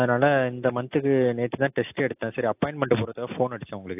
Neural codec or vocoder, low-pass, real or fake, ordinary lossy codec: none; 3.6 kHz; real; AAC, 32 kbps